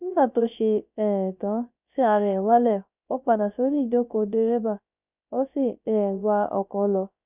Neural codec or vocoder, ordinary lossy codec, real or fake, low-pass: codec, 16 kHz, 0.3 kbps, FocalCodec; none; fake; 3.6 kHz